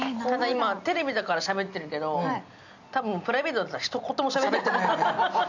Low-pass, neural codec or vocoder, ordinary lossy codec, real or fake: 7.2 kHz; none; none; real